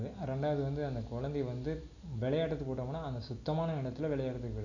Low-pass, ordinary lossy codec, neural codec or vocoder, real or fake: 7.2 kHz; none; none; real